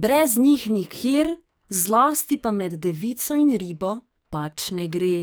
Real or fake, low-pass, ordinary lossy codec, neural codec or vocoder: fake; none; none; codec, 44.1 kHz, 2.6 kbps, SNAC